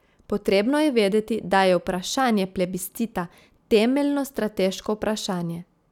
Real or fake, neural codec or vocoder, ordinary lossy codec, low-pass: real; none; none; 19.8 kHz